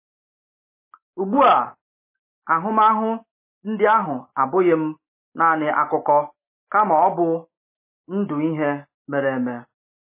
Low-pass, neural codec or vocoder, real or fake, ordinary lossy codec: 3.6 kHz; none; real; MP3, 24 kbps